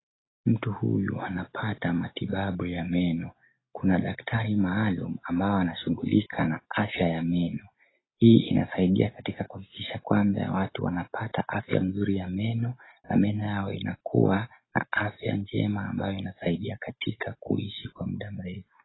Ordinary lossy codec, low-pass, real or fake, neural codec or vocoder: AAC, 16 kbps; 7.2 kHz; real; none